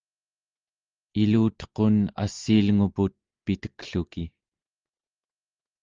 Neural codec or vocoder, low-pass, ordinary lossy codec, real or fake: none; 7.2 kHz; Opus, 32 kbps; real